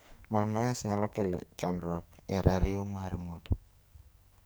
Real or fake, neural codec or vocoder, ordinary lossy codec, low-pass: fake; codec, 44.1 kHz, 2.6 kbps, SNAC; none; none